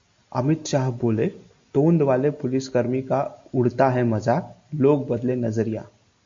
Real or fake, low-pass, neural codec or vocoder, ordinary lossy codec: real; 7.2 kHz; none; MP3, 48 kbps